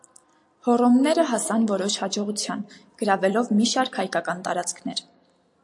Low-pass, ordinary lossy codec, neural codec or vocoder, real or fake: 10.8 kHz; AAC, 64 kbps; none; real